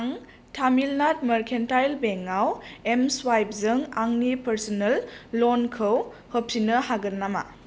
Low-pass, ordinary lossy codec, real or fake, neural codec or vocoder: none; none; real; none